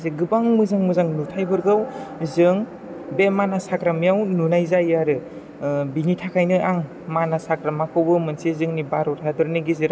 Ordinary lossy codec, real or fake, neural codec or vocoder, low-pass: none; real; none; none